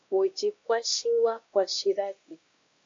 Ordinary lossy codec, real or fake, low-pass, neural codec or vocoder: AAC, 48 kbps; fake; 7.2 kHz; codec, 16 kHz, 1 kbps, X-Codec, WavLM features, trained on Multilingual LibriSpeech